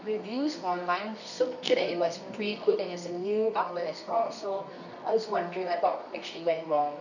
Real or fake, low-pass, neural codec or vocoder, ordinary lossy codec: fake; 7.2 kHz; codec, 24 kHz, 0.9 kbps, WavTokenizer, medium music audio release; none